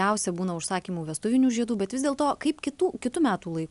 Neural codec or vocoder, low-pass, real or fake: none; 10.8 kHz; real